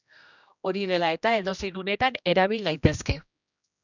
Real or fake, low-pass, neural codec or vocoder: fake; 7.2 kHz; codec, 16 kHz, 1 kbps, X-Codec, HuBERT features, trained on general audio